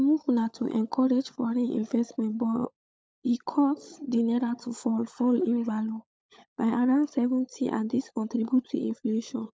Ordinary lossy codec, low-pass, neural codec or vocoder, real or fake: none; none; codec, 16 kHz, 16 kbps, FunCodec, trained on LibriTTS, 50 frames a second; fake